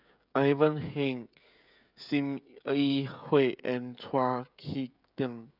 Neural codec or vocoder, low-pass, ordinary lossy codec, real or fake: codec, 16 kHz, 16 kbps, FreqCodec, smaller model; 5.4 kHz; none; fake